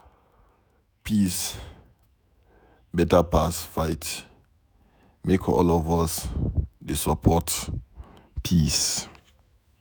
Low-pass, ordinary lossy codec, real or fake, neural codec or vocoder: none; none; fake; autoencoder, 48 kHz, 128 numbers a frame, DAC-VAE, trained on Japanese speech